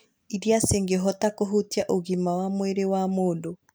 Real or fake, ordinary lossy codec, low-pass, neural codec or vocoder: real; none; none; none